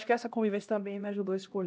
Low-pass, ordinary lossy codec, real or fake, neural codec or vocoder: none; none; fake; codec, 16 kHz, 1 kbps, X-Codec, HuBERT features, trained on LibriSpeech